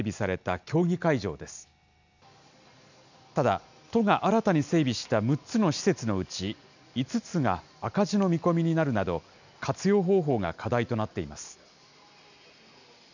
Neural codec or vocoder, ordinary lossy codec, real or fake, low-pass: none; none; real; 7.2 kHz